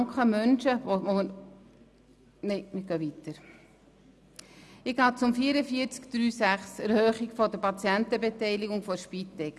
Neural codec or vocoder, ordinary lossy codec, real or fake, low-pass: none; none; real; none